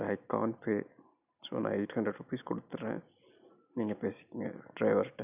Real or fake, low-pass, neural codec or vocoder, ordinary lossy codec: real; 3.6 kHz; none; none